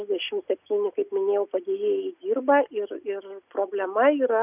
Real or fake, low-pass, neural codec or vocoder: real; 3.6 kHz; none